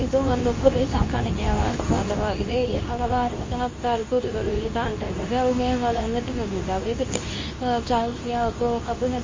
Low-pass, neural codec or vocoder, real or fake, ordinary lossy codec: 7.2 kHz; codec, 24 kHz, 0.9 kbps, WavTokenizer, medium speech release version 2; fake; MP3, 32 kbps